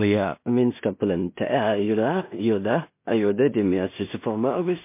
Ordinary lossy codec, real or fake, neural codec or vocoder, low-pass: MP3, 24 kbps; fake; codec, 16 kHz in and 24 kHz out, 0.4 kbps, LongCat-Audio-Codec, two codebook decoder; 3.6 kHz